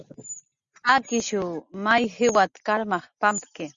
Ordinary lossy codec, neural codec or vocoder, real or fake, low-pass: Opus, 64 kbps; none; real; 7.2 kHz